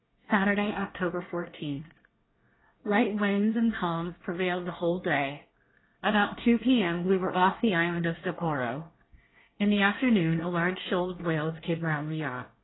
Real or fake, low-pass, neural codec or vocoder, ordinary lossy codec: fake; 7.2 kHz; codec, 24 kHz, 1 kbps, SNAC; AAC, 16 kbps